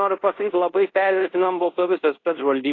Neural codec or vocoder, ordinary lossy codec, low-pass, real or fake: codec, 24 kHz, 0.5 kbps, DualCodec; AAC, 32 kbps; 7.2 kHz; fake